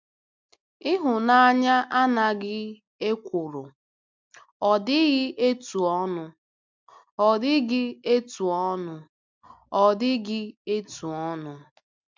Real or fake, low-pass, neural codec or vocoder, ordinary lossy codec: real; 7.2 kHz; none; MP3, 64 kbps